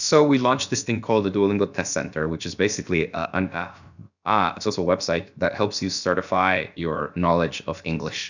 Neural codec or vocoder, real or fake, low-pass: codec, 16 kHz, about 1 kbps, DyCAST, with the encoder's durations; fake; 7.2 kHz